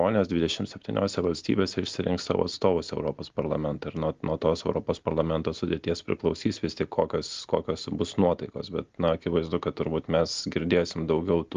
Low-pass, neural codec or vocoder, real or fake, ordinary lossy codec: 7.2 kHz; none; real; Opus, 32 kbps